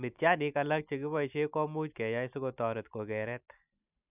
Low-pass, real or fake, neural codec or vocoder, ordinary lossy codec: 3.6 kHz; real; none; none